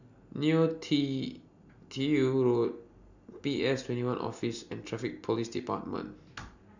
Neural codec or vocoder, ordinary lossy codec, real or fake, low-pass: none; none; real; 7.2 kHz